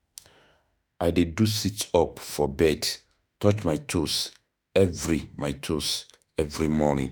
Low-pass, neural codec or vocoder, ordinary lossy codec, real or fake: none; autoencoder, 48 kHz, 32 numbers a frame, DAC-VAE, trained on Japanese speech; none; fake